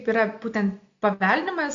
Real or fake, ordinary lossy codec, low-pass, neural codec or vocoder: real; AAC, 64 kbps; 7.2 kHz; none